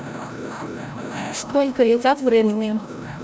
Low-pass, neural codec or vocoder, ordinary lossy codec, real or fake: none; codec, 16 kHz, 0.5 kbps, FreqCodec, larger model; none; fake